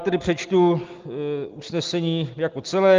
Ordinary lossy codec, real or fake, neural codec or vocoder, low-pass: Opus, 24 kbps; real; none; 7.2 kHz